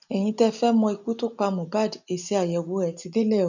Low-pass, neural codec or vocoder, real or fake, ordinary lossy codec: 7.2 kHz; none; real; none